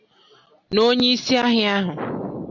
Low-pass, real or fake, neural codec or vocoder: 7.2 kHz; real; none